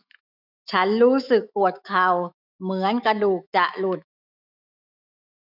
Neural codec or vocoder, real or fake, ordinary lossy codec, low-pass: autoencoder, 48 kHz, 128 numbers a frame, DAC-VAE, trained on Japanese speech; fake; none; 5.4 kHz